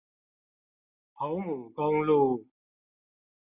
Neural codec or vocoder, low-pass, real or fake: none; 3.6 kHz; real